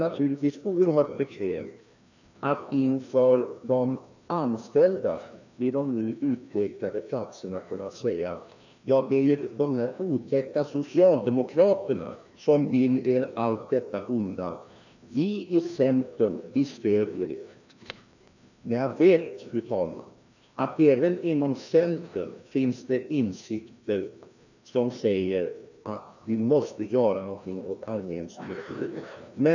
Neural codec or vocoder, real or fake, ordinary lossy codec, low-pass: codec, 16 kHz, 1 kbps, FreqCodec, larger model; fake; none; 7.2 kHz